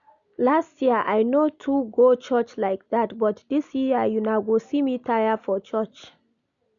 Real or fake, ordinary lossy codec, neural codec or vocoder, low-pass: real; none; none; 7.2 kHz